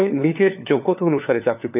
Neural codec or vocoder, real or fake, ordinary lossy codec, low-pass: codec, 16 kHz, 4 kbps, FunCodec, trained on LibriTTS, 50 frames a second; fake; none; 3.6 kHz